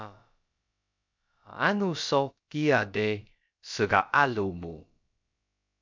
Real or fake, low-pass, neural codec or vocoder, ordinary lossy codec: fake; 7.2 kHz; codec, 16 kHz, about 1 kbps, DyCAST, with the encoder's durations; MP3, 64 kbps